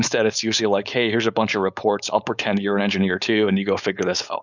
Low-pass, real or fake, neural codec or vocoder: 7.2 kHz; fake; codec, 16 kHz, 4.8 kbps, FACodec